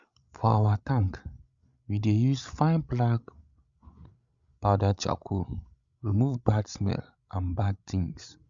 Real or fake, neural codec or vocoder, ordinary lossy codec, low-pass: fake; codec, 16 kHz, 16 kbps, FreqCodec, larger model; Opus, 64 kbps; 7.2 kHz